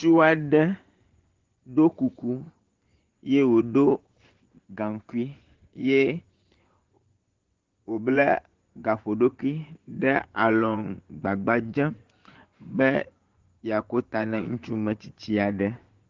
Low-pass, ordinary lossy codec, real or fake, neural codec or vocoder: 7.2 kHz; Opus, 16 kbps; fake; vocoder, 24 kHz, 100 mel bands, Vocos